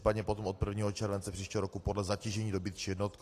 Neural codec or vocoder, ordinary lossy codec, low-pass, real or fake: none; AAC, 48 kbps; 14.4 kHz; real